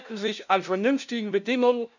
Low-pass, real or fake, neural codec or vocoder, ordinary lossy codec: 7.2 kHz; fake; codec, 16 kHz, 0.5 kbps, FunCodec, trained on LibriTTS, 25 frames a second; none